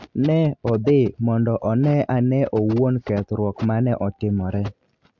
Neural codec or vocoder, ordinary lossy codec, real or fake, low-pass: none; MP3, 64 kbps; real; 7.2 kHz